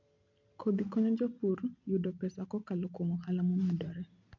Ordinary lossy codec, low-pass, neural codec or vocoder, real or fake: none; 7.2 kHz; none; real